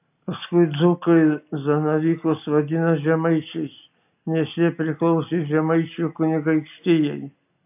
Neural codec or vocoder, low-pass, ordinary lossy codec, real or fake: none; 3.6 kHz; AAC, 32 kbps; real